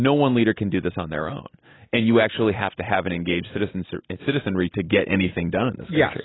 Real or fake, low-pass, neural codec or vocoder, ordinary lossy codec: real; 7.2 kHz; none; AAC, 16 kbps